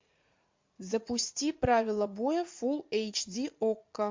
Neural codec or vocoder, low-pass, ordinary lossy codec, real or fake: vocoder, 44.1 kHz, 80 mel bands, Vocos; 7.2 kHz; MP3, 48 kbps; fake